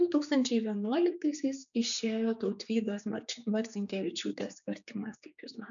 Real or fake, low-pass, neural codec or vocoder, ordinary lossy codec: fake; 7.2 kHz; codec, 16 kHz, 4 kbps, X-Codec, HuBERT features, trained on general audio; MP3, 96 kbps